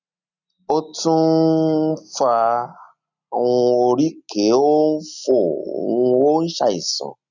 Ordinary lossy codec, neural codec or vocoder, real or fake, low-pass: none; none; real; 7.2 kHz